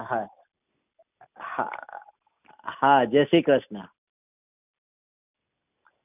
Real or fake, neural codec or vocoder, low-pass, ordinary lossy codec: real; none; 3.6 kHz; none